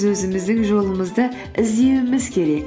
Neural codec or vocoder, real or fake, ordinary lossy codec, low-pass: none; real; none; none